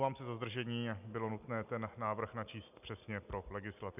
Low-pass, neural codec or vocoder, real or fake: 3.6 kHz; none; real